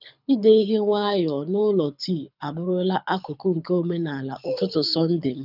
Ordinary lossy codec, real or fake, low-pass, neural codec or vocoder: none; fake; 5.4 kHz; codec, 24 kHz, 6 kbps, HILCodec